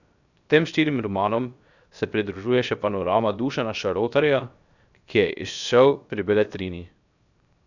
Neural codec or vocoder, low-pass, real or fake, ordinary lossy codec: codec, 16 kHz, 0.7 kbps, FocalCodec; 7.2 kHz; fake; none